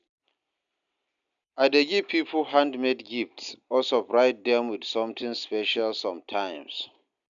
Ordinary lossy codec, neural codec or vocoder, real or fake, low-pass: none; none; real; 7.2 kHz